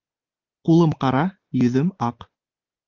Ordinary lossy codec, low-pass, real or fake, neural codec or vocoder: Opus, 24 kbps; 7.2 kHz; real; none